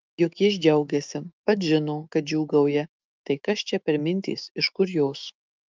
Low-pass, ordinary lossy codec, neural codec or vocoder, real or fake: 7.2 kHz; Opus, 32 kbps; autoencoder, 48 kHz, 128 numbers a frame, DAC-VAE, trained on Japanese speech; fake